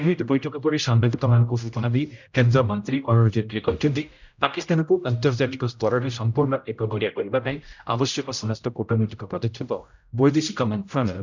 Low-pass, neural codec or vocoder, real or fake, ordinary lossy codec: 7.2 kHz; codec, 16 kHz, 0.5 kbps, X-Codec, HuBERT features, trained on general audio; fake; none